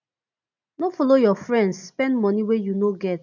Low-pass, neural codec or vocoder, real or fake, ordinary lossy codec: 7.2 kHz; none; real; none